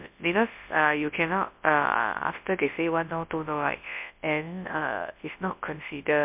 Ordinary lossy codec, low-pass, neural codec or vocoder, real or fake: MP3, 32 kbps; 3.6 kHz; codec, 24 kHz, 0.9 kbps, WavTokenizer, large speech release; fake